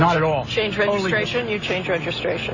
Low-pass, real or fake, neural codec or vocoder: 7.2 kHz; real; none